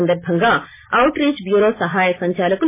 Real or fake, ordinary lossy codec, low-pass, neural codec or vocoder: real; MP3, 16 kbps; 3.6 kHz; none